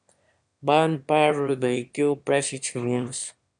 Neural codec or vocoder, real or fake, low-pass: autoencoder, 22.05 kHz, a latent of 192 numbers a frame, VITS, trained on one speaker; fake; 9.9 kHz